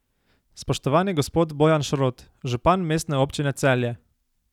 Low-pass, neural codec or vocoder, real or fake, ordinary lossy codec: 19.8 kHz; none; real; none